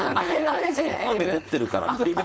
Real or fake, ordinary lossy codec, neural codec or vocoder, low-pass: fake; none; codec, 16 kHz, 4.8 kbps, FACodec; none